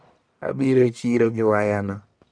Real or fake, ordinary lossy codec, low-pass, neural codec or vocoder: fake; none; 9.9 kHz; codec, 44.1 kHz, 1.7 kbps, Pupu-Codec